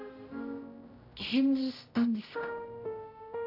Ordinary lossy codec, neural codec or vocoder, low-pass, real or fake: AAC, 32 kbps; codec, 16 kHz, 0.5 kbps, X-Codec, HuBERT features, trained on general audio; 5.4 kHz; fake